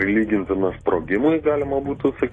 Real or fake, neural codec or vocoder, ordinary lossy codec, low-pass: real; none; AAC, 32 kbps; 9.9 kHz